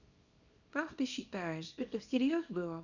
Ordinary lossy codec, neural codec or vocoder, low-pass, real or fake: none; codec, 24 kHz, 0.9 kbps, WavTokenizer, small release; 7.2 kHz; fake